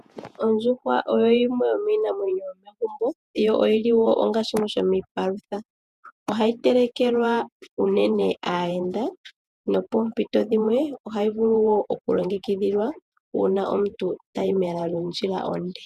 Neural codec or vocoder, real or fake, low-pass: vocoder, 48 kHz, 128 mel bands, Vocos; fake; 14.4 kHz